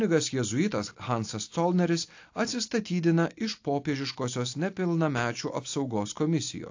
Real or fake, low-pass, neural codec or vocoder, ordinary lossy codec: real; 7.2 kHz; none; AAC, 48 kbps